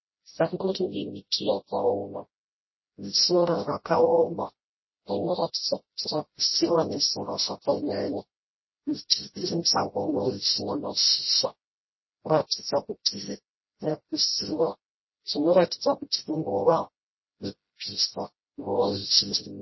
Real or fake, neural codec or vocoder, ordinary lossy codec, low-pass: fake; codec, 16 kHz, 0.5 kbps, FreqCodec, smaller model; MP3, 24 kbps; 7.2 kHz